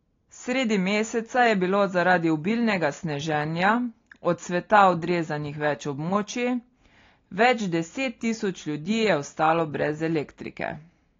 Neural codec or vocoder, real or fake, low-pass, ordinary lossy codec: none; real; 7.2 kHz; AAC, 32 kbps